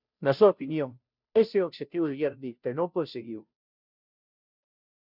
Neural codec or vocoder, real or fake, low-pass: codec, 16 kHz, 0.5 kbps, FunCodec, trained on Chinese and English, 25 frames a second; fake; 5.4 kHz